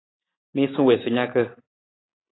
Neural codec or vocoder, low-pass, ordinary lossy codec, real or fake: none; 7.2 kHz; AAC, 16 kbps; real